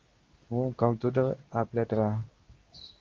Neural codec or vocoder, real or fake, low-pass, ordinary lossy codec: codec, 24 kHz, 0.9 kbps, WavTokenizer, medium speech release version 2; fake; 7.2 kHz; Opus, 32 kbps